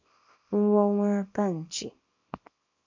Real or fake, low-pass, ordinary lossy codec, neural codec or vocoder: fake; 7.2 kHz; MP3, 64 kbps; codec, 24 kHz, 0.9 kbps, WavTokenizer, small release